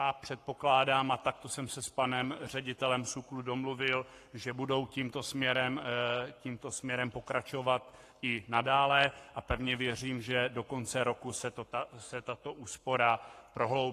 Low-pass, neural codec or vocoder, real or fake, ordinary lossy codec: 14.4 kHz; codec, 44.1 kHz, 7.8 kbps, Pupu-Codec; fake; AAC, 48 kbps